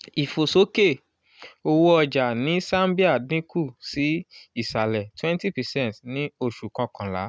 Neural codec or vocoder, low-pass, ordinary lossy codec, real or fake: none; none; none; real